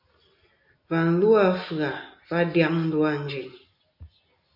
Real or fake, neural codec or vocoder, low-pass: real; none; 5.4 kHz